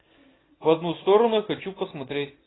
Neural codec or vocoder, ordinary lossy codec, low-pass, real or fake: none; AAC, 16 kbps; 7.2 kHz; real